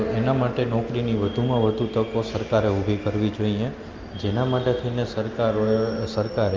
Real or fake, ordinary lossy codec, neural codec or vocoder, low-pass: real; Opus, 32 kbps; none; 7.2 kHz